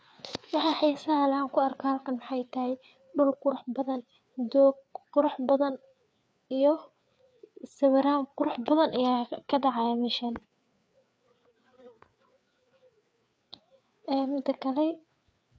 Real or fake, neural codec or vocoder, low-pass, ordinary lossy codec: fake; codec, 16 kHz, 4 kbps, FreqCodec, larger model; none; none